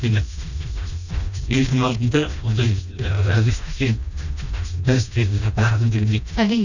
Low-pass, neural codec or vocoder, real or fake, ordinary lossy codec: 7.2 kHz; codec, 16 kHz, 1 kbps, FreqCodec, smaller model; fake; none